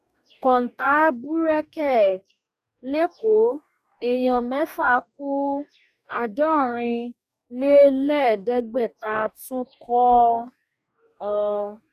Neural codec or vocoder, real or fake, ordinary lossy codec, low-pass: codec, 44.1 kHz, 2.6 kbps, DAC; fake; none; 14.4 kHz